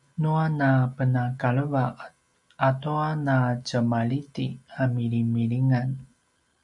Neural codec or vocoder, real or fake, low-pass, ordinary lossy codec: none; real; 10.8 kHz; MP3, 96 kbps